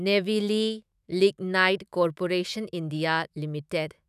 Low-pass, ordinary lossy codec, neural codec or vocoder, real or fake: 14.4 kHz; none; autoencoder, 48 kHz, 128 numbers a frame, DAC-VAE, trained on Japanese speech; fake